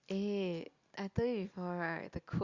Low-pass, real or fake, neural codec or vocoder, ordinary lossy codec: 7.2 kHz; real; none; Opus, 64 kbps